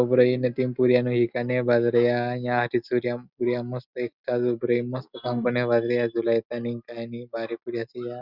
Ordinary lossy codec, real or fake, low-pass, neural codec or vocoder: Opus, 64 kbps; real; 5.4 kHz; none